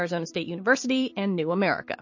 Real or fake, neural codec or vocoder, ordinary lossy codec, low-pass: fake; vocoder, 44.1 kHz, 128 mel bands every 256 samples, BigVGAN v2; MP3, 32 kbps; 7.2 kHz